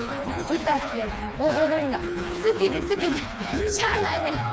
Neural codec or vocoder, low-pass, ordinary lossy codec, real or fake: codec, 16 kHz, 2 kbps, FreqCodec, smaller model; none; none; fake